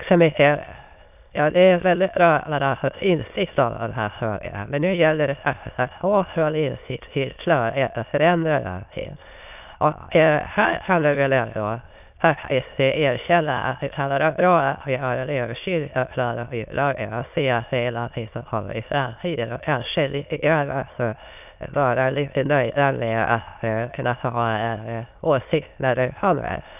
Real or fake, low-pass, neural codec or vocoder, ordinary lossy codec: fake; 3.6 kHz; autoencoder, 22.05 kHz, a latent of 192 numbers a frame, VITS, trained on many speakers; none